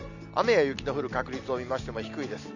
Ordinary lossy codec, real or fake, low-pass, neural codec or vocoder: none; real; 7.2 kHz; none